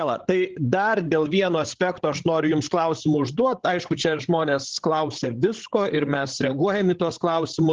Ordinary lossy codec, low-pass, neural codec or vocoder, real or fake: Opus, 16 kbps; 7.2 kHz; codec, 16 kHz, 16 kbps, FreqCodec, larger model; fake